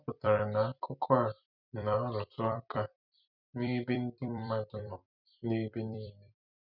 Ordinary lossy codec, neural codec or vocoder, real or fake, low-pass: none; vocoder, 44.1 kHz, 128 mel bands every 256 samples, BigVGAN v2; fake; 5.4 kHz